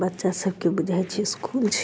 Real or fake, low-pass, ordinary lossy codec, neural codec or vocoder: real; none; none; none